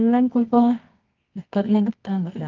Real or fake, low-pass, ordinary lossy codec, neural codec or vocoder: fake; 7.2 kHz; Opus, 24 kbps; codec, 24 kHz, 0.9 kbps, WavTokenizer, medium music audio release